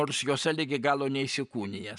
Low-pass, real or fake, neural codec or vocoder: 10.8 kHz; real; none